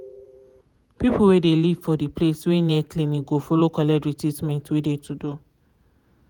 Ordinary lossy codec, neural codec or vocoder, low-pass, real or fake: none; vocoder, 48 kHz, 128 mel bands, Vocos; none; fake